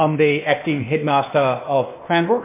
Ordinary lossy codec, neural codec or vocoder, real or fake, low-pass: MP3, 24 kbps; codec, 16 kHz, 0.8 kbps, ZipCodec; fake; 3.6 kHz